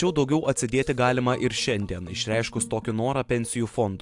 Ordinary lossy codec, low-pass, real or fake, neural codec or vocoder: AAC, 64 kbps; 10.8 kHz; real; none